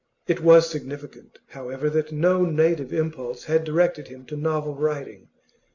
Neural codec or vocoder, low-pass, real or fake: none; 7.2 kHz; real